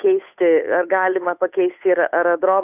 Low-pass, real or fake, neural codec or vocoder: 3.6 kHz; fake; codec, 16 kHz, 8 kbps, FunCodec, trained on Chinese and English, 25 frames a second